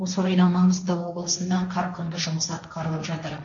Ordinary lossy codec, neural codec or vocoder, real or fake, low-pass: none; codec, 16 kHz, 1.1 kbps, Voila-Tokenizer; fake; 7.2 kHz